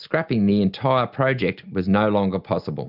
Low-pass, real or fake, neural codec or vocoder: 5.4 kHz; real; none